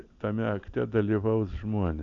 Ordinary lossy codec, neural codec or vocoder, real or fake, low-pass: MP3, 96 kbps; none; real; 7.2 kHz